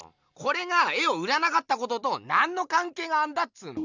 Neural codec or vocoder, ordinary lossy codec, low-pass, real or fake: none; none; 7.2 kHz; real